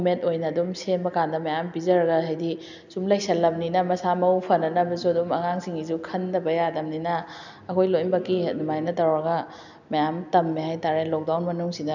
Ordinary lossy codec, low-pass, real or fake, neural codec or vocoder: none; 7.2 kHz; real; none